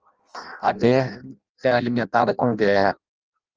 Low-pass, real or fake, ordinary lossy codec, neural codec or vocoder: 7.2 kHz; fake; Opus, 24 kbps; codec, 16 kHz in and 24 kHz out, 0.6 kbps, FireRedTTS-2 codec